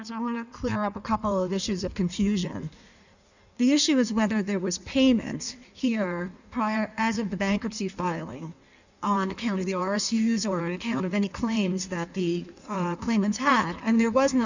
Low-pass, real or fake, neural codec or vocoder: 7.2 kHz; fake; codec, 16 kHz in and 24 kHz out, 1.1 kbps, FireRedTTS-2 codec